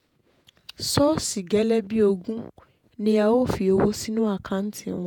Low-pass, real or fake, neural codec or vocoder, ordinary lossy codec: 19.8 kHz; fake; vocoder, 48 kHz, 128 mel bands, Vocos; none